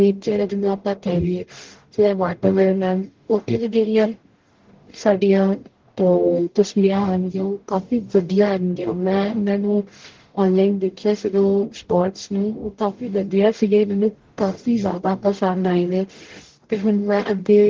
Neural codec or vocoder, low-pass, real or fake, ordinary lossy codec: codec, 44.1 kHz, 0.9 kbps, DAC; 7.2 kHz; fake; Opus, 16 kbps